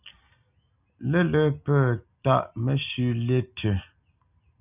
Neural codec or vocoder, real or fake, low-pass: none; real; 3.6 kHz